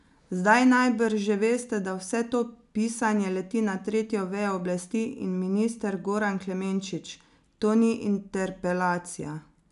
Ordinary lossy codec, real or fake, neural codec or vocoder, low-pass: none; real; none; 10.8 kHz